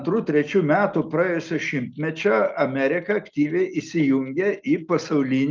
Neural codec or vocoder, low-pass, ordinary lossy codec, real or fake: none; 7.2 kHz; Opus, 24 kbps; real